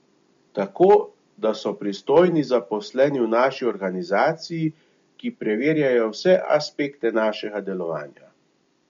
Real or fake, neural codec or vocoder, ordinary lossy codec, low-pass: real; none; MP3, 48 kbps; 7.2 kHz